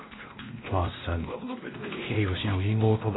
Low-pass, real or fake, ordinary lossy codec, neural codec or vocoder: 7.2 kHz; fake; AAC, 16 kbps; codec, 16 kHz, 1 kbps, X-Codec, WavLM features, trained on Multilingual LibriSpeech